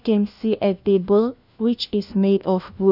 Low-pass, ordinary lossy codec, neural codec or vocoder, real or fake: 5.4 kHz; none; codec, 16 kHz, 1 kbps, FunCodec, trained on LibriTTS, 50 frames a second; fake